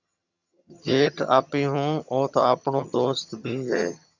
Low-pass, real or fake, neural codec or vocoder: 7.2 kHz; fake; vocoder, 22.05 kHz, 80 mel bands, HiFi-GAN